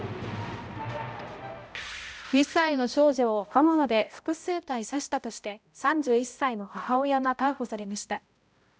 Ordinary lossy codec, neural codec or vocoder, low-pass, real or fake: none; codec, 16 kHz, 0.5 kbps, X-Codec, HuBERT features, trained on balanced general audio; none; fake